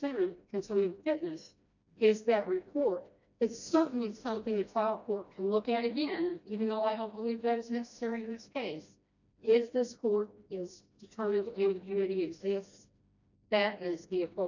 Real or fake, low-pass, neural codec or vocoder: fake; 7.2 kHz; codec, 16 kHz, 1 kbps, FreqCodec, smaller model